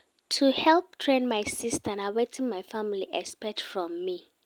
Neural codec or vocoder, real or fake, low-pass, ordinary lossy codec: none; real; 19.8 kHz; Opus, 32 kbps